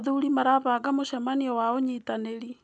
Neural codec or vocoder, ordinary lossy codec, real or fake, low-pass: none; none; real; 9.9 kHz